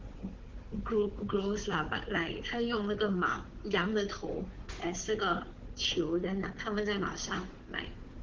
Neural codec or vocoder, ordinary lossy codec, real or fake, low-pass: codec, 16 kHz, 4 kbps, FunCodec, trained on Chinese and English, 50 frames a second; Opus, 16 kbps; fake; 7.2 kHz